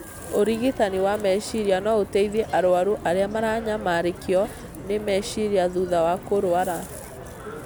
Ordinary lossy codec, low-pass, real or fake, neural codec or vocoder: none; none; real; none